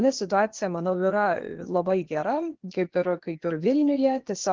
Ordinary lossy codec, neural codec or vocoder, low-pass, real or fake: Opus, 16 kbps; codec, 16 kHz, 0.8 kbps, ZipCodec; 7.2 kHz; fake